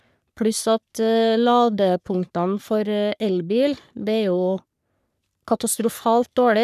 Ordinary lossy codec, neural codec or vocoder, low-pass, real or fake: none; codec, 44.1 kHz, 3.4 kbps, Pupu-Codec; 14.4 kHz; fake